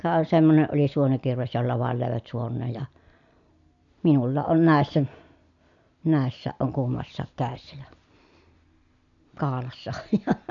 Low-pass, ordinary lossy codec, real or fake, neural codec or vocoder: 7.2 kHz; none; real; none